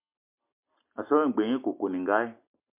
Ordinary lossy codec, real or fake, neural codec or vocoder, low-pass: MP3, 32 kbps; real; none; 3.6 kHz